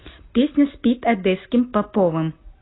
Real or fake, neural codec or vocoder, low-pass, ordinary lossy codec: real; none; 7.2 kHz; AAC, 16 kbps